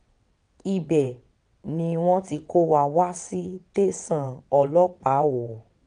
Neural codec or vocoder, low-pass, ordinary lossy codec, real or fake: vocoder, 22.05 kHz, 80 mel bands, WaveNeXt; 9.9 kHz; none; fake